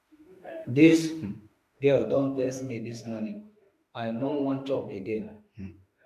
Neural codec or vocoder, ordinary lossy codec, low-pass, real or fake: autoencoder, 48 kHz, 32 numbers a frame, DAC-VAE, trained on Japanese speech; MP3, 96 kbps; 14.4 kHz; fake